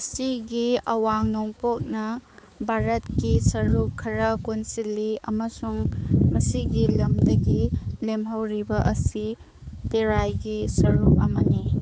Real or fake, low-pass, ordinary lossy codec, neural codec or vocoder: fake; none; none; codec, 16 kHz, 4 kbps, X-Codec, HuBERT features, trained on balanced general audio